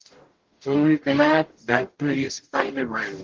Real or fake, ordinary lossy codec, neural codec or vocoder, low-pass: fake; Opus, 16 kbps; codec, 44.1 kHz, 0.9 kbps, DAC; 7.2 kHz